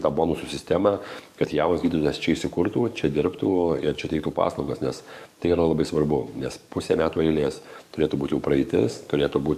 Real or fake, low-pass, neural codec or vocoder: fake; 14.4 kHz; codec, 44.1 kHz, 7.8 kbps, DAC